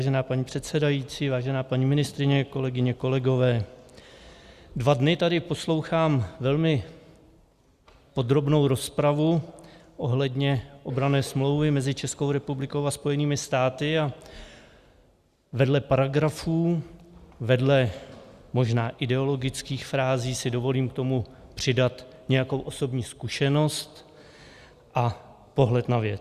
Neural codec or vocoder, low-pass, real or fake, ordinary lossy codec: none; 14.4 kHz; real; Opus, 64 kbps